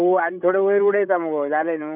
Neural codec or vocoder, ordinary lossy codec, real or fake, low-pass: codec, 16 kHz, 16 kbps, FreqCodec, larger model; none; fake; 3.6 kHz